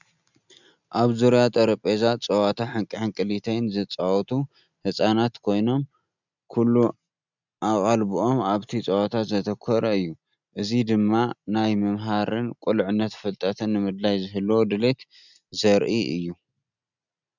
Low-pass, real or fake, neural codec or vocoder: 7.2 kHz; real; none